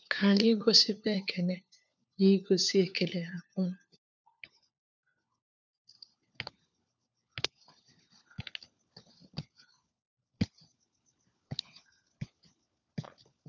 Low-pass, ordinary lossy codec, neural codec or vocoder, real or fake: 7.2 kHz; none; codec, 16 kHz, 4 kbps, FunCodec, trained on LibriTTS, 50 frames a second; fake